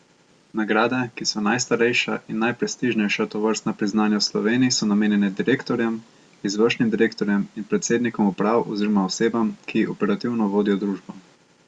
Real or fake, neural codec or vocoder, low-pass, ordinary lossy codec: real; none; 9.9 kHz; Opus, 64 kbps